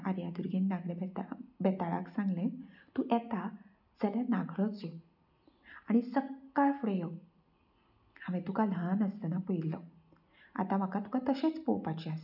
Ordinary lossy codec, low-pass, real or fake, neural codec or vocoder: none; 5.4 kHz; real; none